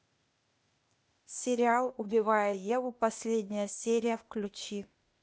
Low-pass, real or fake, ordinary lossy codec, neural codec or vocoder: none; fake; none; codec, 16 kHz, 0.8 kbps, ZipCodec